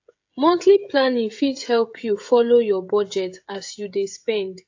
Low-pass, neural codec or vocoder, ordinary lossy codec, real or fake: 7.2 kHz; codec, 16 kHz, 16 kbps, FreqCodec, smaller model; AAC, 48 kbps; fake